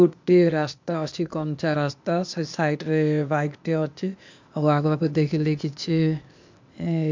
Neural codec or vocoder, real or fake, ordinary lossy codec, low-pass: codec, 16 kHz, 0.8 kbps, ZipCodec; fake; MP3, 64 kbps; 7.2 kHz